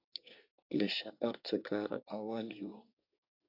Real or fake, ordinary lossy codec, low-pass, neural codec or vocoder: fake; AAC, 48 kbps; 5.4 kHz; codec, 24 kHz, 1 kbps, SNAC